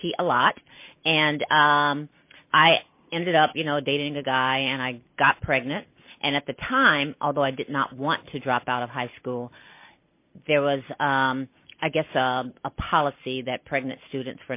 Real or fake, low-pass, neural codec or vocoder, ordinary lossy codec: real; 3.6 kHz; none; MP3, 24 kbps